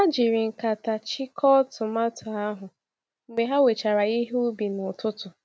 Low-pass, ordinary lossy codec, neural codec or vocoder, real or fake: none; none; none; real